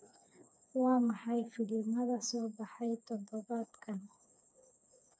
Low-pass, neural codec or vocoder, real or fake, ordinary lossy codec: none; codec, 16 kHz, 4 kbps, FreqCodec, smaller model; fake; none